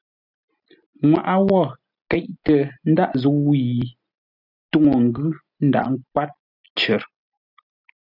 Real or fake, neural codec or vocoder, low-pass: real; none; 5.4 kHz